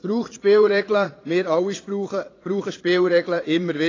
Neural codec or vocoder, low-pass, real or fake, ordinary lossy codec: vocoder, 22.05 kHz, 80 mel bands, Vocos; 7.2 kHz; fake; AAC, 32 kbps